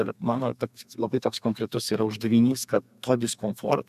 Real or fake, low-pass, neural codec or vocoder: fake; 14.4 kHz; codec, 32 kHz, 1.9 kbps, SNAC